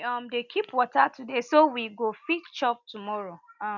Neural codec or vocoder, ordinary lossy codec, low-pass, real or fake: none; none; 7.2 kHz; real